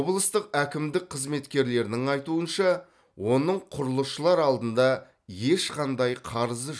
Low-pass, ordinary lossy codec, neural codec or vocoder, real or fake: none; none; none; real